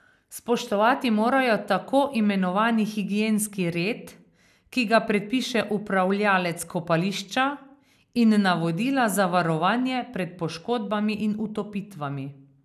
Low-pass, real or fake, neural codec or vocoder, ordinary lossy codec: 14.4 kHz; real; none; none